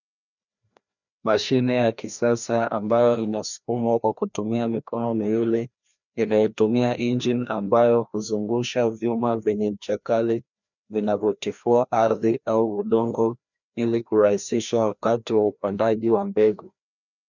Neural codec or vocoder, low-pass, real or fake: codec, 16 kHz, 1 kbps, FreqCodec, larger model; 7.2 kHz; fake